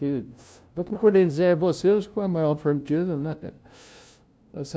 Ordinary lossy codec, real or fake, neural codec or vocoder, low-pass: none; fake; codec, 16 kHz, 0.5 kbps, FunCodec, trained on LibriTTS, 25 frames a second; none